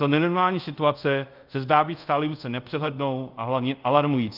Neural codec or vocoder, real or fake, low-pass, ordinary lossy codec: codec, 24 kHz, 0.5 kbps, DualCodec; fake; 5.4 kHz; Opus, 24 kbps